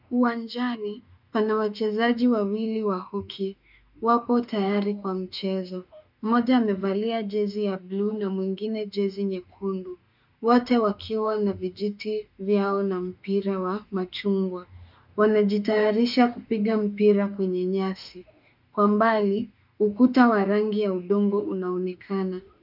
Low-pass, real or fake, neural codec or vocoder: 5.4 kHz; fake; autoencoder, 48 kHz, 32 numbers a frame, DAC-VAE, trained on Japanese speech